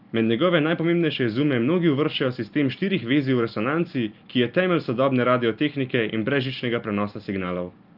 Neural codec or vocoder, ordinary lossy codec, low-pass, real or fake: none; Opus, 24 kbps; 5.4 kHz; real